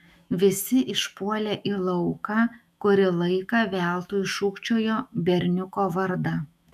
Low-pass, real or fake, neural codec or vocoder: 14.4 kHz; fake; autoencoder, 48 kHz, 128 numbers a frame, DAC-VAE, trained on Japanese speech